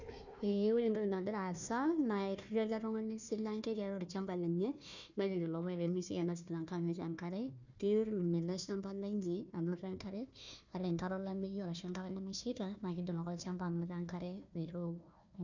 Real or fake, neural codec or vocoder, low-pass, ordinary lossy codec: fake; codec, 16 kHz, 1 kbps, FunCodec, trained on Chinese and English, 50 frames a second; 7.2 kHz; none